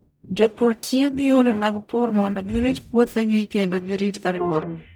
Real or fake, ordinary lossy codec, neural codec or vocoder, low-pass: fake; none; codec, 44.1 kHz, 0.9 kbps, DAC; none